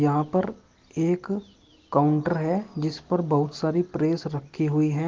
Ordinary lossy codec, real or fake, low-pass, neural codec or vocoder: Opus, 16 kbps; real; 7.2 kHz; none